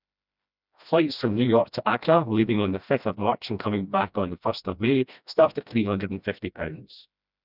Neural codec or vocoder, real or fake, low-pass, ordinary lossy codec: codec, 16 kHz, 1 kbps, FreqCodec, smaller model; fake; 5.4 kHz; none